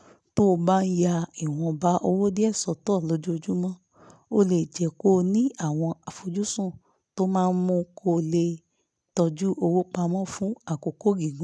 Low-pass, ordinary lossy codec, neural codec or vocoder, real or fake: none; none; none; real